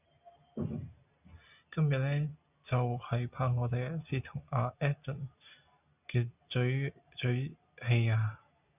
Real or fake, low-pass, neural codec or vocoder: real; 3.6 kHz; none